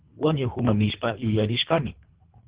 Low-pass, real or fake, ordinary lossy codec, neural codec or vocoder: 3.6 kHz; fake; Opus, 16 kbps; codec, 24 kHz, 3 kbps, HILCodec